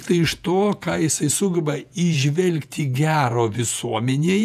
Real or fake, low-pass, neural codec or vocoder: fake; 14.4 kHz; vocoder, 48 kHz, 128 mel bands, Vocos